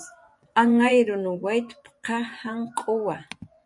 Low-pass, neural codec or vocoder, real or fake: 10.8 kHz; vocoder, 24 kHz, 100 mel bands, Vocos; fake